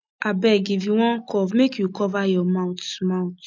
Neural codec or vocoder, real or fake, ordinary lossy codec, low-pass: none; real; none; none